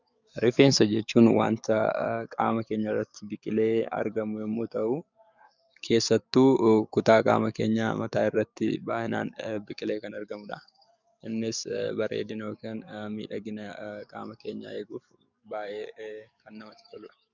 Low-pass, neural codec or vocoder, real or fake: 7.2 kHz; codec, 44.1 kHz, 7.8 kbps, DAC; fake